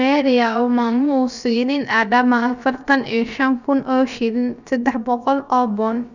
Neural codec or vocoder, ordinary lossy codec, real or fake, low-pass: codec, 16 kHz, about 1 kbps, DyCAST, with the encoder's durations; none; fake; 7.2 kHz